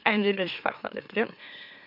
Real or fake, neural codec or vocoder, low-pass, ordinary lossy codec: fake; autoencoder, 44.1 kHz, a latent of 192 numbers a frame, MeloTTS; 5.4 kHz; MP3, 48 kbps